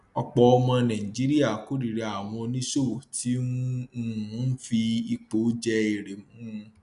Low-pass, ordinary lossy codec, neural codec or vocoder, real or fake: 10.8 kHz; none; none; real